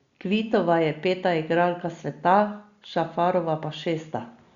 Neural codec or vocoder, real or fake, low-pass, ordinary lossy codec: none; real; 7.2 kHz; Opus, 64 kbps